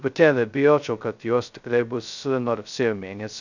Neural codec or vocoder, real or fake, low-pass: codec, 16 kHz, 0.2 kbps, FocalCodec; fake; 7.2 kHz